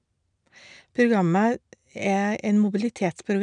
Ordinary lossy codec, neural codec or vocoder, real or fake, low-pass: none; none; real; 9.9 kHz